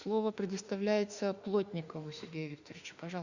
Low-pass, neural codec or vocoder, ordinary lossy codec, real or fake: 7.2 kHz; autoencoder, 48 kHz, 32 numbers a frame, DAC-VAE, trained on Japanese speech; Opus, 64 kbps; fake